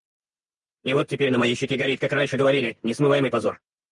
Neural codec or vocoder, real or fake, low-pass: none; real; 10.8 kHz